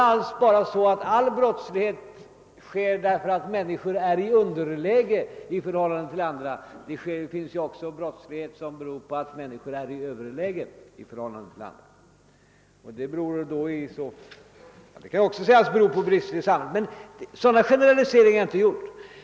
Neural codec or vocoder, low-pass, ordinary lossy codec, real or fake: none; none; none; real